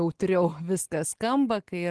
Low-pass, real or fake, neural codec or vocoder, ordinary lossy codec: 10.8 kHz; real; none; Opus, 16 kbps